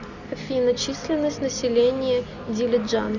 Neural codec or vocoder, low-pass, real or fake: none; 7.2 kHz; real